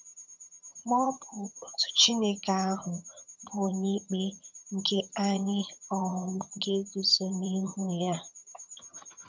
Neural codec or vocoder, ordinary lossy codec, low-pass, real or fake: vocoder, 22.05 kHz, 80 mel bands, HiFi-GAN; MP3, 64 kbps; 7.2 kHz; fake